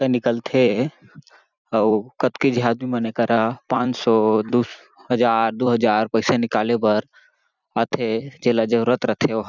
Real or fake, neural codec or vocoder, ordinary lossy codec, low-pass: fake; vocoder, 44.1 kHz, 128 mel bands every 256 samples, BigVGAN v2; none; 7.2 kHz